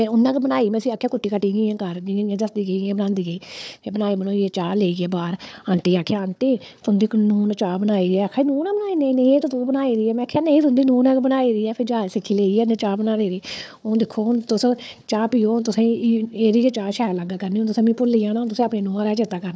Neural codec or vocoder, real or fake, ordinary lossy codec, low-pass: codec, 16 kHz, 4 kbps, FunCodec, trained on Chinese and English, 50 frames a second; fake; none; none